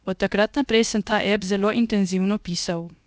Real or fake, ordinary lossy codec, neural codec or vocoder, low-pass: fake; none; codec, 16 kHz, 0.7 kbps, FocalCodec; none